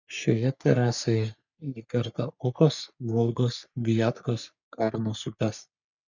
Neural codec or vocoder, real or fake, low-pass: codec, 44.1 kHz, 3.4 kbps, Pupu-Codec; fake; 7.2 kHz